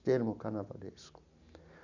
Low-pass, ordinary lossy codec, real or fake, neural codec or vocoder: 7.2 kHz; none; real; none